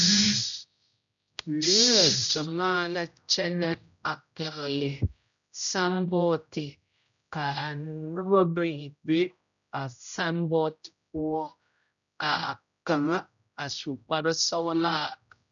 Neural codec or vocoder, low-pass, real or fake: codec, 16 kHz, 0.5 kbps, X-Codec, HuBERT features, trained on general audio; 7.2 kHz; fake